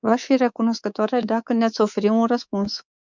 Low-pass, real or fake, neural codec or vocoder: 7.2 kHz; fake; codec, 24 kHz, 3.1 kbps, DualCodec